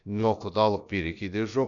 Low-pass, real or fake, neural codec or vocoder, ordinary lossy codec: 7.2 kHz; fake; codec, 16 kHz, about 1 kbps, DyCAST, with the encoder's durations; none